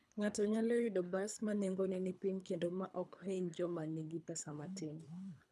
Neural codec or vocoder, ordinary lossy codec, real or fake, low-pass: codec, 24 kHz, 3 kbps, HILCodec; none; fake; none